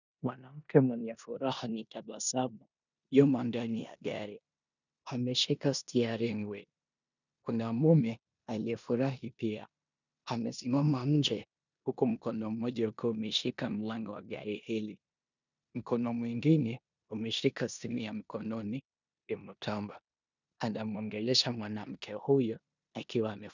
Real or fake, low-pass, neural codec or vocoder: fake; 7.2 kHz; codec, 16 kHz in and 24 kHz out, 0.9 kbps, LongCat-Audio-Codec, four codebook decoder